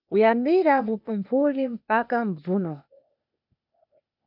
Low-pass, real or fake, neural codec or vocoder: 5.4 kHz; fake; codec, 16 kHz, 0.8 kbps, ZipCodec